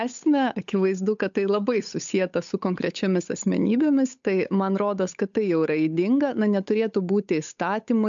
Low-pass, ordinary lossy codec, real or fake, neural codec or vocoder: 7.2 kHz; MP3, 64 kbps; fake; codec, 16 kHz, 8 kbps, FunCodec, trained on Chinese and English, 25 frames a second